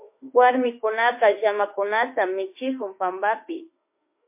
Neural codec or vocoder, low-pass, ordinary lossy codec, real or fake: autoencoder, 48 kHz, 32 numbers a frame, DAC-VAE, trained on Japanese speech; 3.6 kHz; MP3, 24 kbps; fake